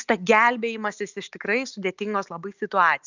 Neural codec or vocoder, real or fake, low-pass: none; real; 7.2 kHz